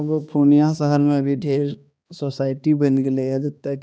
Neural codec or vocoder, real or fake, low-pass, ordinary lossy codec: codec, 16 kHz, 2 kbps, X-Codec, HuBERT features, trained on balanced general audio; fake; none; none